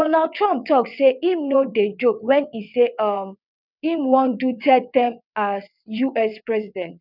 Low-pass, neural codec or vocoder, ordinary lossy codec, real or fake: 5.4 kHz; vocoder, 22.05 kHz, 80 mel bands, WaveNeXt; none; fake